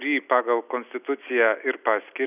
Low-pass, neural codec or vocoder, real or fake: 3.6 kHz; none; real